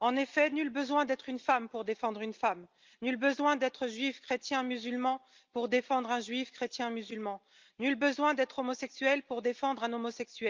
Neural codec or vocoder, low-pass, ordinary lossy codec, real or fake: none; 7.2 kHz; Opus, 32 kbps; real